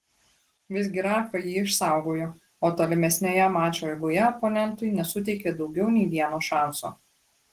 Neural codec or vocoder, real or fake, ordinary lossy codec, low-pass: none; real; Opus, 16 kbps; 14.4 kHz